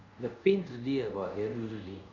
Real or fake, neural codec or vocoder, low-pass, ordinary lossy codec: fake; codec, 24 kHz, 0.5 kbps, DualCodec; 7.2 kHz; none